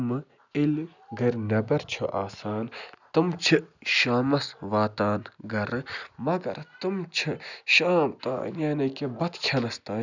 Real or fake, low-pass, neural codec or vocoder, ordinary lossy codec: real; 7.2 kHz; none; none